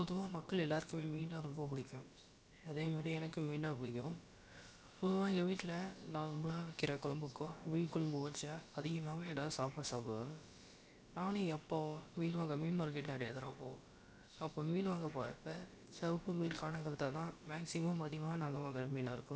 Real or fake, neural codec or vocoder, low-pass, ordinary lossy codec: fake; codec, 16 kHz, about 1 kbps, DyCAST, with the encoder's durations; none; none